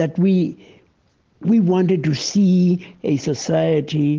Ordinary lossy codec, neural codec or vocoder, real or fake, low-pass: Opus, 16 kbps; none; real; 7.2 kHz